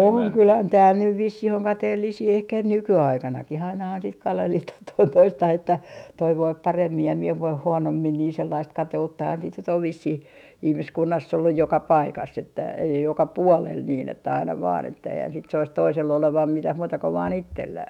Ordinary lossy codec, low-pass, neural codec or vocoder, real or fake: none; 19.8 kHz; autoencoder, 48 kHz, 128 numbers a frame, DAC-VAE, trained on Japanese speech; fake